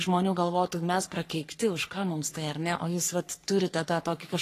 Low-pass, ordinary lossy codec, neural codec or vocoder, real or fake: 14.4 kHz; AAC, 48 kbps; codec, 44.1 kHz, 3.4 kbps, Pupu-Codec; fake